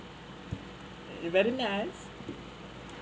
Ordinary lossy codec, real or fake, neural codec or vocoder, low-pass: none; real; none; none